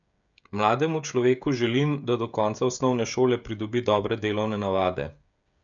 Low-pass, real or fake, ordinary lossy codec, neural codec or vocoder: 7.2 kHz; fake; none; codec, 16 kHz, 16 kbps, FreqCodec, smaller model